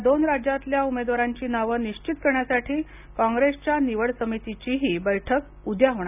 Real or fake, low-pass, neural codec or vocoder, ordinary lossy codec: real; 3.6 kHz; none; none